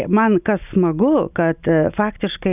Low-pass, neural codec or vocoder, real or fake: 3.6 kHz; none; real